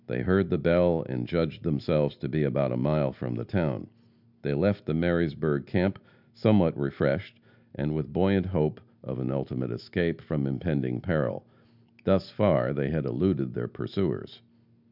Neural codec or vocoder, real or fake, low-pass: none; real; 5.4 kHz